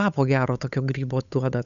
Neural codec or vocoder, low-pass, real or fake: codec, 16 kHz, 8 kbps, FunCodec, trained on Chinese and English, 25 frames a second; 7.2 kHz; fake